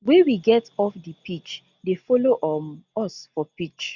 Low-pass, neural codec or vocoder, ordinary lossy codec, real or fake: 7.2 kHz; none; none; real